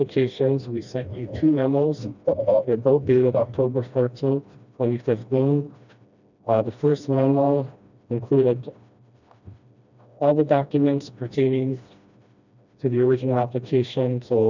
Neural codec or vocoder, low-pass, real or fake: codec, 16 kHz, 1 kbps, FreqCodec, smaller model; 7.2 kHz; fake